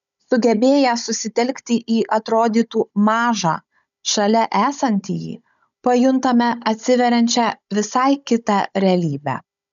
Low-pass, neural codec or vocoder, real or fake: 7.2 kHz; codec, 16 kHz, 16 kbps, FunCodec, trained on Chinese and English, 50 frames a second; fake